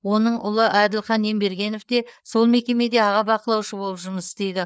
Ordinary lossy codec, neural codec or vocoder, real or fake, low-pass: none; codec, 16 kHz, 4 kbps, FreqCodec, larger model; fake; none